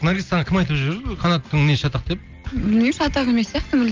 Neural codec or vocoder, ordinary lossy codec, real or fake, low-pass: none; Opus, 24 kbps; real; 7.2 kHz